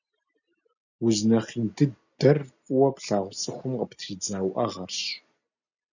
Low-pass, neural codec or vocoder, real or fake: 7.2 kHz; none; real